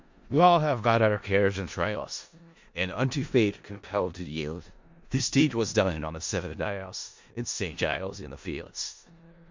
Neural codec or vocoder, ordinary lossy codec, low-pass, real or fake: codec, 16 kHz in and 24 kHz out, 0.4 kbps, LongCat-Audio-Codec, four codebook decoder; MP3, 48 kbps; 7.2 kHz; fake